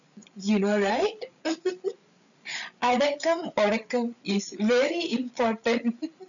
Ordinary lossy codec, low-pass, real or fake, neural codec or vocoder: none; 7.2 kHz; fake; codec, 16 kHz, 8 kbps, FreqCodec, larger model